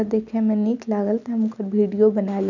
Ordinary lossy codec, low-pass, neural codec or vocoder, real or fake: none; 7.2 kHz; none; real